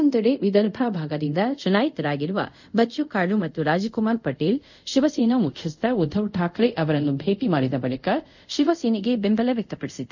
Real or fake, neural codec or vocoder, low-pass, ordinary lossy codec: fake; codec, 24 kHz, 0.5 kbps, DualCodec; 7.2 kHz; none